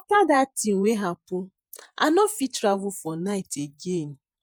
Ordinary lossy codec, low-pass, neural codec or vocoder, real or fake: none; none; vocoder, 48 kHz, 128 mel bands, Vocos; fake